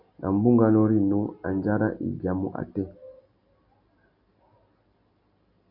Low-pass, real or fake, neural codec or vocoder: 5.4 kHz; real; none